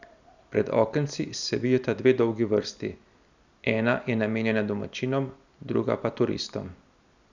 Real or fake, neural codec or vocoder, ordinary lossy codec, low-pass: real; none; none; 7.2 kHz